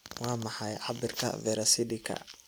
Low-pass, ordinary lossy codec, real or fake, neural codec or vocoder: none; none; real; none